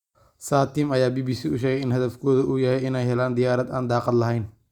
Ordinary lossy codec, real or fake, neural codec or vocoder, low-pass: Opus, 64 kbps; real; none; 19.8 kHz